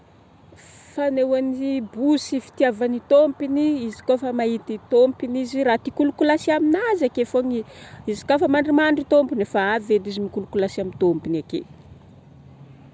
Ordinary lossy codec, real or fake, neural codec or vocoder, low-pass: none; real; none; none